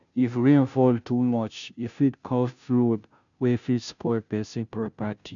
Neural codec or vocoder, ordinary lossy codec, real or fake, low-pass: codec, 16 kHz, 0.5 kbps, FunCodec, trained on Chinese and English, 25 frames a second; none; fake; 7.2 kHz